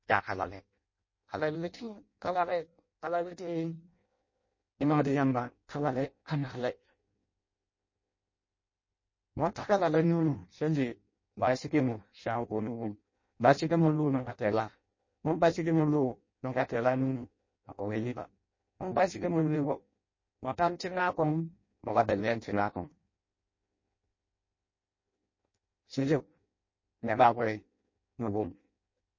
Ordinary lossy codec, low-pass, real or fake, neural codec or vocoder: MP3, 32 kbps; 7.2 kHz; fake; codec, 16 kHz in and 24 kHz out, 0.6 kbps, FireRedTTS-2 codec